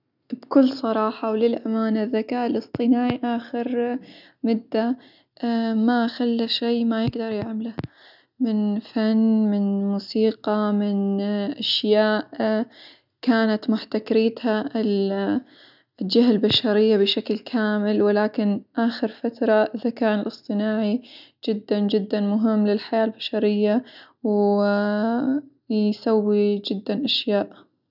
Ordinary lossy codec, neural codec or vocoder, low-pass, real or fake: none; none; 5.4 kHz; real